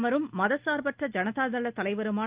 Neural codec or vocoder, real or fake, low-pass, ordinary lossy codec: none; real; 3.6 kHz; Opus, 32 kbps